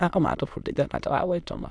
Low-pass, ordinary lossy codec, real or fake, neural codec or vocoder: none; none; fake; autoencoder, 22.05 kHz, a latent of 192 numbers a frame, VITS, trained on many speakers